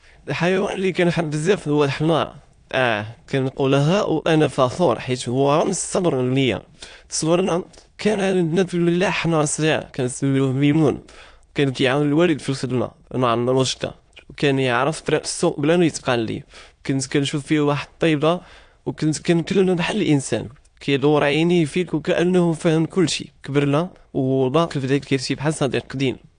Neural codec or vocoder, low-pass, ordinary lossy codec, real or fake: autoencoder, 22.05 kHz, a latent of 192 numbers a frame, VITS, trained on many speakers; 9.9 kHz; AAC, 64 kbps; fake